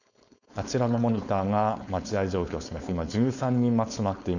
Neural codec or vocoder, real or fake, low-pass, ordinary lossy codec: codec, 16 kHz, 4.8 kbps, FACodec; fake; 7.2 kHz; none